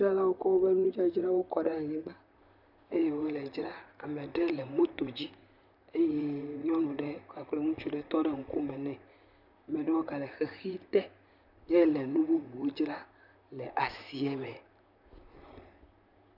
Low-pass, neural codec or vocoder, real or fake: 5.4 kHz; vocoder, 44.1 kHz, 128 mel bands, Pupu-Vocoder; fake